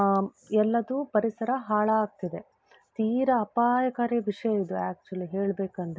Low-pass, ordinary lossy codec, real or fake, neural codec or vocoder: none; none; real; none